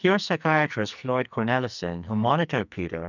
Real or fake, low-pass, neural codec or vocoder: fake; 7.2 kHz; codec, 44.1 kHz, 2.6 kbps, SNAC